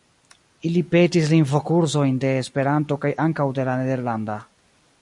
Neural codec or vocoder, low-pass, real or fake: none; 10.8 kHz; real